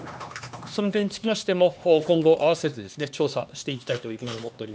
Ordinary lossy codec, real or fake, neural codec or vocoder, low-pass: none; fake; codec, 16 kHz, 2 kbps, X-Codec, HuBERT features, trained on LibriSpeech; none